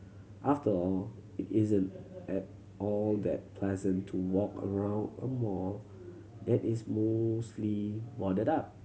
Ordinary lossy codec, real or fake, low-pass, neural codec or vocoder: none; real; none; none